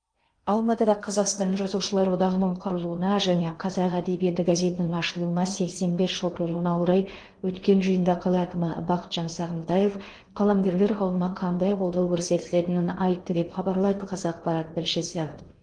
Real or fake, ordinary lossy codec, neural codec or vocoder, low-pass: fake; Opus, 24 kbps; codec, 16 kHz in and 24 kHz out, 0.8 kbps, FocalCodec, streaming, 65536 codes; 9.9 kHz